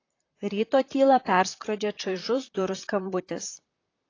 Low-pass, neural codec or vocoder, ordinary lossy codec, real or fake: 7.2 kHz; vocoder, 44.1 kHz, 128 mel bands, Pupu-Vocoder; AAC, 32 kbps; fake